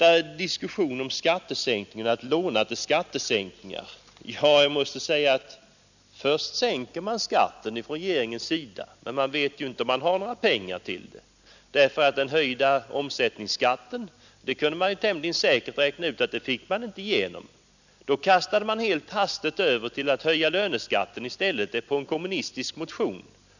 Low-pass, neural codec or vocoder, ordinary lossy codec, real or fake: 7.2 kHz; none; none; real